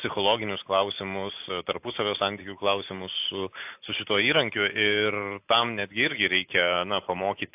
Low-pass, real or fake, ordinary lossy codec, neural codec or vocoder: 3.6 kHz; real; AAC, 32 kbps; none